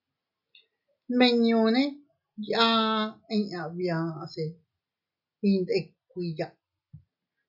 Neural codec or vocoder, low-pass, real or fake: none; 5.4 kHz; real